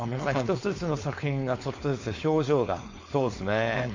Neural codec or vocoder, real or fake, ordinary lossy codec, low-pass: codec, 16 kHz, 4.8 kbps, FACodec; fake; MP3, 48 kbps; 7.2 kHz